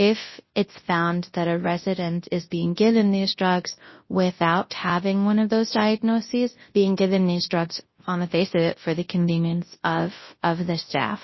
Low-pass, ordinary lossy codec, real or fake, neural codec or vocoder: 7.2 kHz; MP3, 24 kbps; fake; codec, 24 kHz, 0.9 kbps, WavTokenizer, large speech release